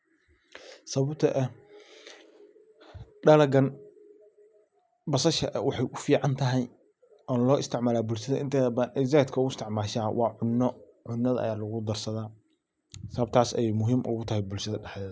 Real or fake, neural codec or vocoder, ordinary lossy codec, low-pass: real; none; none; none